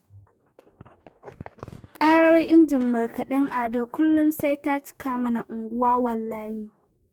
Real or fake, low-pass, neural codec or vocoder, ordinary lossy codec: fake; 19.8 kHz; codec, 44.1 kHz, 2.6 kbps, DAC; MP3, 96 kbps